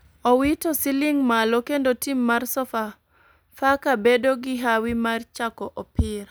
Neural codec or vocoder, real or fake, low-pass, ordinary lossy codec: none; real; none; none